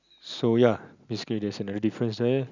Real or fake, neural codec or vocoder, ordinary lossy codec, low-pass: real; none; none; 7.2 kHz